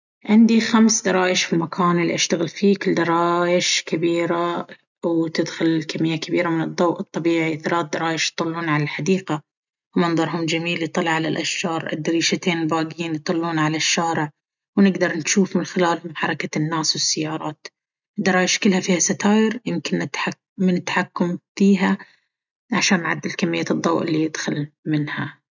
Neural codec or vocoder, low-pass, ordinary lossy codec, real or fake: none; 7.2 kHz; none; real